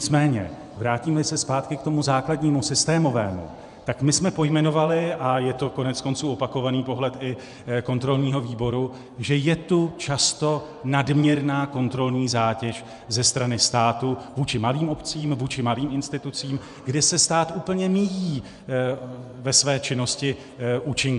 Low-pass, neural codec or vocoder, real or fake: 10.8 kHz; vocoder, 24 kHz, 100 mel bands, Vocos; fake